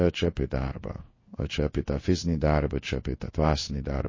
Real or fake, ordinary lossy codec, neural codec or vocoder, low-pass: fake; MP3, 32 kbps; codec, 16 kHz in and 24 kHz out, 1 kbps, XY-Tokenizer; 7.2 kHz